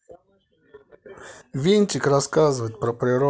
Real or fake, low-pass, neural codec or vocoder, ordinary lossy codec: real; none; none; none